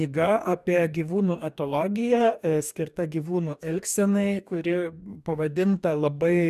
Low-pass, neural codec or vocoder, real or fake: 14.4 kHz; codec, 44.1 kHz, 2.6 kbps, DAC; fake